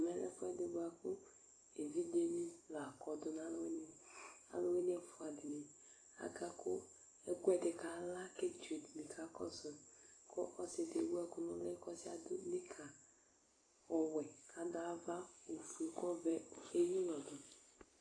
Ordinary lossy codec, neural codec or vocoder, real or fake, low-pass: AAC, 32 kbps; vocoder, 44.1 kHz, 128 mel bands every 256 samples, BigVGAN v2; fake; 9.9 kHz